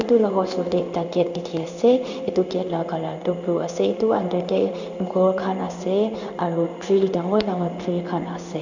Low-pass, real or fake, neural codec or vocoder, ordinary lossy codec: 7.2 kHz; fake; codec, 16 kHz in and 24 kHz out, 1 kbps, XY-Tokenizer; none